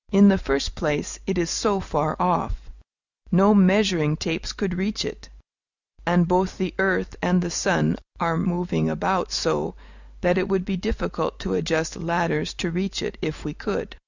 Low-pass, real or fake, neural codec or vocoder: 7.2 kHz; real; none